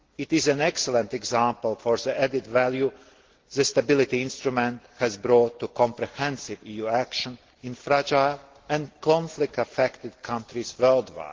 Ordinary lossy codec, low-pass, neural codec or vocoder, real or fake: Opus, 16 kbps; 7.2 kHz; none; real